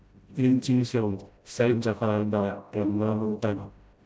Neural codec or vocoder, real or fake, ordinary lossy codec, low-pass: codec, 16 kHz, 0.5 kbps, FreqCodec, smaller model; fake; none; none